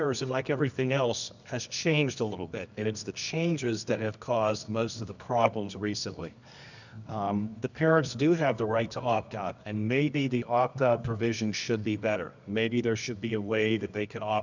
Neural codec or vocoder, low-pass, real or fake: codec, 24 kHz, 0.9 kbps, WavTokenizer, medium music audio release; 7.2 kHz; fake